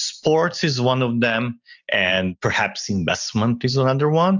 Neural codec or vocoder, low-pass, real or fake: none; 7.2 kHz; real